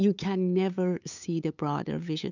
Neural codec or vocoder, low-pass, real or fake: codec, 16 kHz, 8 kbps, FunCodec, trained on LibriTTS, 25 frames a second; 7.2 kHz; fake